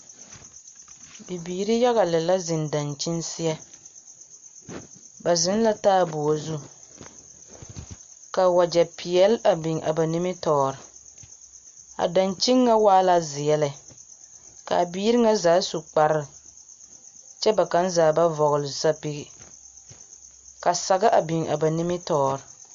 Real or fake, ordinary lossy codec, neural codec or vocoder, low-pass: real; MP3, 48 kbps; none; 7.2 kHz